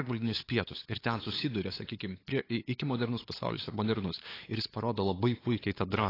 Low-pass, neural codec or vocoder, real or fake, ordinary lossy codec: 5.4 kHz; codec, 16 kHz, 8 kbps, FunCodec, trained on LibriTTS, 25 frames a second; fake; AAC, 24 kbps